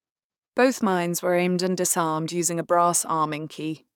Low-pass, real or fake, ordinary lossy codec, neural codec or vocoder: 19.8 kHz; fake; none; codec, 44.1 kHz, 7.8 kbps, DAC